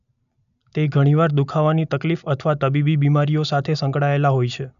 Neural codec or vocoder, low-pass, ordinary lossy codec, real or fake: none; 7.2 kHz; none; real